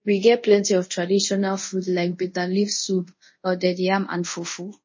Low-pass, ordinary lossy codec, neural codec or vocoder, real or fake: 7.2 kHz; MP3, 32 kbps; codec, 24 kHz, 0.5 kbps, DualCodec; fake